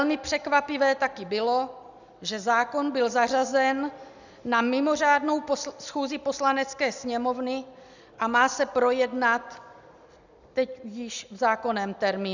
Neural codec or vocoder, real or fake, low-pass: none; real; 7.2 kHz